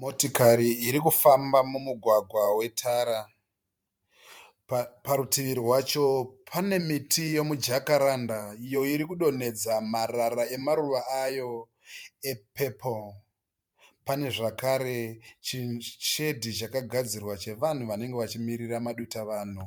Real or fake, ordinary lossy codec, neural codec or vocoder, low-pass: fake; MP3, 96 kbps; vocoder, 44.1 kHz, 128 mel bands every 512 samples, BigVGAN v2; 19.8 kHz